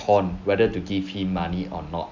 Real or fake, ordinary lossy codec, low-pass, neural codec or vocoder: real; none; 7.2 kHz; none